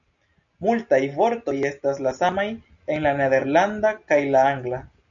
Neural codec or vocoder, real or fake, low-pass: none; real; 7.2 kHz